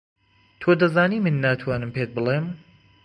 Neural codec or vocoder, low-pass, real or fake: none; 9.9 kHz; real